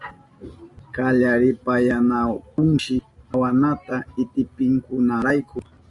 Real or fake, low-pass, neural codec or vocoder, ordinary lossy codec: real; 10.8 kHz; none; AAC, 64 kbps